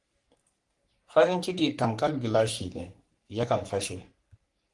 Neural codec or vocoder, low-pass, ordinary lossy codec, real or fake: codec, 44.1 kHz, 3.4 kbps, Pupu-Codec; 10.8 kHz; Opus, 24 kbps; fake